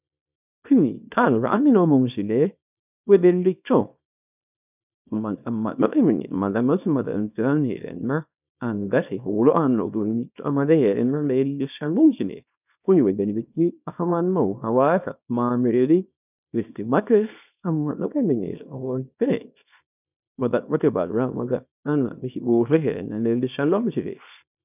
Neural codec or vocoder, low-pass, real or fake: codec, 24 kHz, 0.9 kbps, WavTokenizer, small release; 3.6 kHz; fake